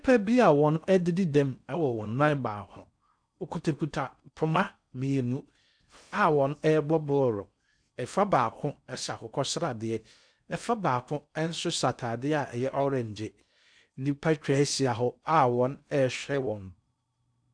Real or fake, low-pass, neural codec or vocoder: fake; 9.9 kHz; codec, 16 kHz in and 24 kHz out, 0.6 kbps, FocalCodec, streaming, 4096 codes